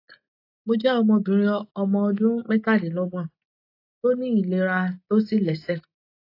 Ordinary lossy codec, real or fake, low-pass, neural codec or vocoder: AAC, 32 kbps; real; 5.4 kHz; none